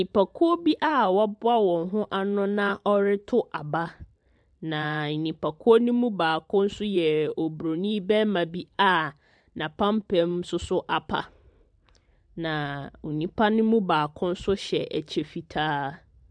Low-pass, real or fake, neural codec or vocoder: 9.9 kHz; fake; vocoder, 44.1 kHz, 128 mel bands every 512 samples, BigVGAN v2